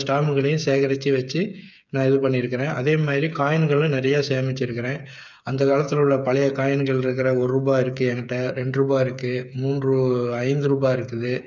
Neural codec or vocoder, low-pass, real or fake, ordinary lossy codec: codec, 16 kHz, 8 kbps, FreqCodec, smaller model; 7.2 kHz; fake; none